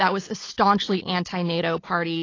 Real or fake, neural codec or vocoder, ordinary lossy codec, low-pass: real; none; AAC, 32 kbps; 7.2 kHz